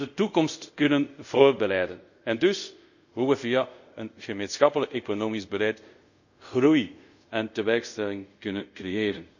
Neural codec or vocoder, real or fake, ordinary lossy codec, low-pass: codec, 24 kHz, 0.5 kbps, DualCodec; fake; none; 7.2 kHz